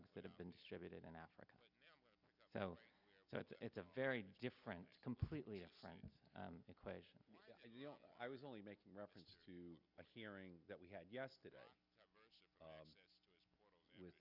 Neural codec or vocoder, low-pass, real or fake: none; 5.4 kHz; real